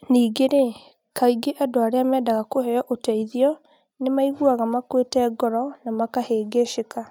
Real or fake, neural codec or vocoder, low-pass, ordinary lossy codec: real; none; 19.8 kHz; none